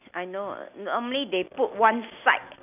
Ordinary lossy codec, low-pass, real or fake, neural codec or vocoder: none; 3.6 kHz; real; none